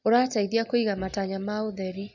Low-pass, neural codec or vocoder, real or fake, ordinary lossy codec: 7.2 kHz; none; real; none